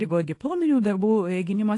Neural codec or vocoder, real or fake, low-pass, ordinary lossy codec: codec, 24 kHz, 0.9 kbps, WavTokenizer, small release; fake; 10.8 kHz; AAC, 48 kbps